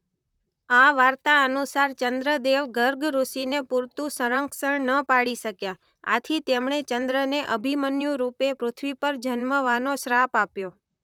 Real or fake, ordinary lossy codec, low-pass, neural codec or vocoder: fake; none; 19.8 kHz; vocoder, 44.1 kHz, 128 mel bands, Pupu-Vocoder